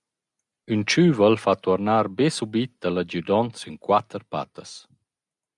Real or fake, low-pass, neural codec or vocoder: real; 10.8 kHz; none